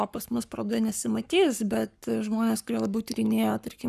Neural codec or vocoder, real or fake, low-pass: codec, 44.1 kHz, 7.8 kbps, Pupu-Codec; fake; 14.4 kHz